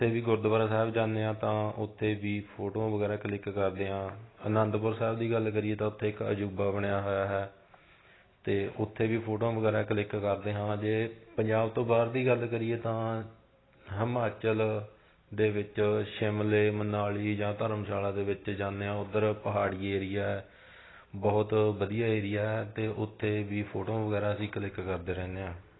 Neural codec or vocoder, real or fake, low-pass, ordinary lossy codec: none; real; 7.2 kHz; AAC, 16 kbps